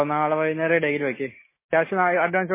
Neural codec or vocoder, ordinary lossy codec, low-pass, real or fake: none; MP3, 16 kbps; 3.6 kHz; real